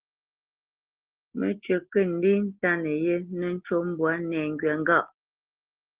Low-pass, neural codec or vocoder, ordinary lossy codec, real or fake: 3.6 kHz; none; Opus, 16 kbps; real